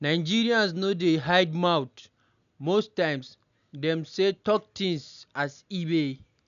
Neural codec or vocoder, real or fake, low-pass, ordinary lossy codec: none; real; 7.2 kHz; none